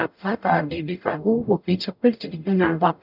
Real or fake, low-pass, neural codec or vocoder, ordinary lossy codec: fake; 5.4 kHz; codec, 44.1 kHz, 0.9 kbps, DAC; none